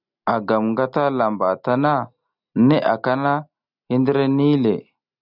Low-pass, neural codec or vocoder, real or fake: 5.4 kHz; none; real